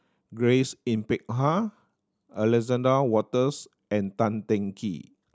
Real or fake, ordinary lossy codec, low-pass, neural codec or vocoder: real; none; none; none